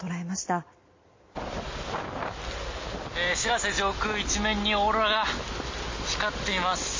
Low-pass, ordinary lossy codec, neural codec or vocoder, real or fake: 7.2 kHz; MP3, 32 kbps; none; real